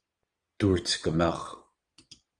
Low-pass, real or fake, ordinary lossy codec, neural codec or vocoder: 9.9 kHz; fake; Opus, 24 kbps; vocoder, 22.05 kHz, 80 mel bands, Vocos